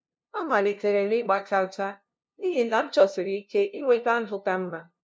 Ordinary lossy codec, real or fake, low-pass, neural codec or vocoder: none; fake; none; codec, 16 kHz, 0.5 kbps, FunCodec, trained on LibriTTS, 25 frames a second